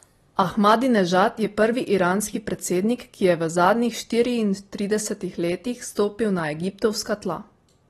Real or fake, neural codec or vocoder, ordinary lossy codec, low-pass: real; none; AAC, 32 kbps; 19.8 kHz